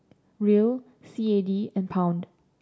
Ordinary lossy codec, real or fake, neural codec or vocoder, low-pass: none; real; none; none